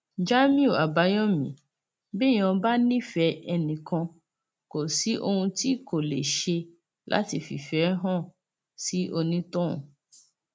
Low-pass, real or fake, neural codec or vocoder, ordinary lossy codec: none; real; none; none